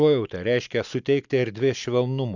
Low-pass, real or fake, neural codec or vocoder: 7.2 kHz; real; none